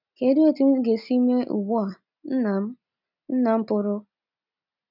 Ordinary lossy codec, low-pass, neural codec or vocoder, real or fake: none; 5.4 kHz; none; real